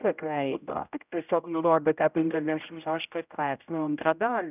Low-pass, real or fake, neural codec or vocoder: 3.6 kHz; fake; codec, 16 kHz, 0.5 kbps, X-Codec, HuBERT features, trained on general audio